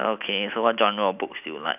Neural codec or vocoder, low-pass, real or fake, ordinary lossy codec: none; 3.6 kHz; real; none